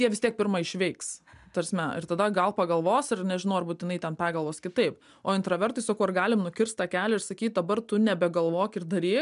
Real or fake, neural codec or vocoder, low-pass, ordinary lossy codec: real; none; 10.8 kHz; MP3, 96 kbps